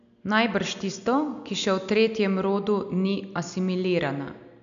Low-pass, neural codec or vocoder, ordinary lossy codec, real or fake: 7.2 kHz; none; none; real